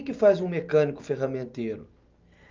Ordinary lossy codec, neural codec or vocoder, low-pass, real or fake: Opus, 24 kbps; none; 7.2 kHz; real